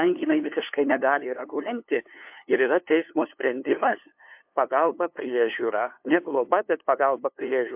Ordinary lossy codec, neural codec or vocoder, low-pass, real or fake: MP3, 32 kbps; codec, 16 kHz, 2 kbps, FunCodec, trained on LibriTTS, 25 frames a second; 3.6 kHz; fake